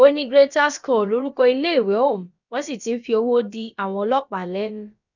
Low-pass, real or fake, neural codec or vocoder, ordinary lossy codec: 7.2 kHz; fake; codec, 16 kHz, about 1 kbps, DyCAST, with the encoder's durations; none